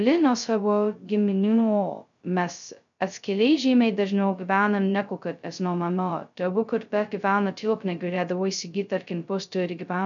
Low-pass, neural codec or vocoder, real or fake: 7.2 kHz; codec, 16 kHz, 0.2 kbps, FocalCodec; fake